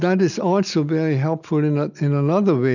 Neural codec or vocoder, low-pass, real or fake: none; 7.2 kHz; real